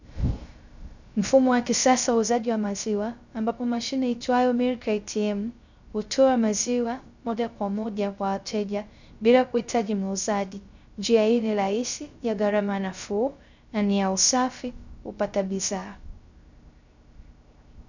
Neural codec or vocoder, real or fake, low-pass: codec, 16 kHz, 0.3 kbps, FocalCodec; fake; 7.2 kHz